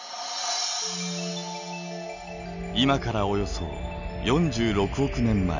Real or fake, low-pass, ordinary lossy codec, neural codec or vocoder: real; 7.2 kHz; none; none